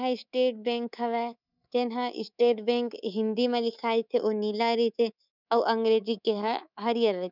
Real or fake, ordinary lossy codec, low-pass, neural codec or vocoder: fake; none; 5.4 kHz; codec, 24 kHz, 3.1 kbps, DualCodec